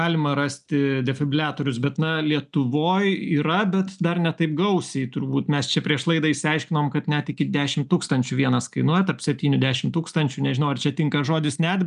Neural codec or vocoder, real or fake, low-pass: none; real; 10.8 kHz